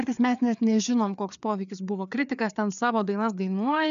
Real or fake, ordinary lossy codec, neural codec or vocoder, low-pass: fake; MP3, 96 kbps; codec, 16 kHz, 4 kbps, FreqCodec, larger model; 7.2 kHz